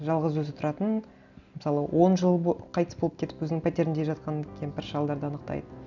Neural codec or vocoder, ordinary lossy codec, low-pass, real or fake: none; none; 7.2 kHz; real